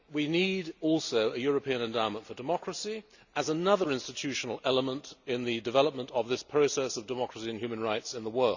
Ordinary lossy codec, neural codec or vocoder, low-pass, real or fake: none; none; 7.2 kHz; real